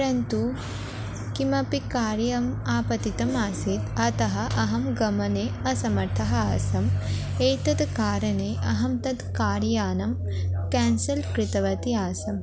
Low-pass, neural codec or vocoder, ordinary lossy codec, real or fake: none; none; none; real